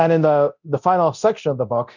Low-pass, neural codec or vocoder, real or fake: 7.2 kHz; codec, 24 kHz, 0.9 kbps, DualCodec; fake